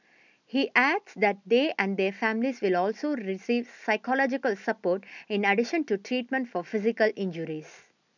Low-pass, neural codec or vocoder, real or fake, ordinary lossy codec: 7.2 kHz; none; real; none